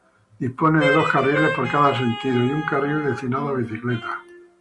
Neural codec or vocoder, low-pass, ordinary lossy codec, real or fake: none; 10.8 kHz; Opus, 64 kbps; real